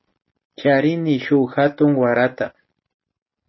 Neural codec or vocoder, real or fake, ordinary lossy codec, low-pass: none; real; MP3, 24 kbps; 7.2 kHz